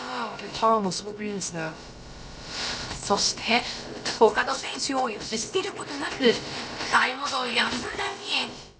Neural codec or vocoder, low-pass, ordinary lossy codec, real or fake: codec, 16 kHz, about 1 kbps, DyCAST, with the encoder's durations; none; none; fake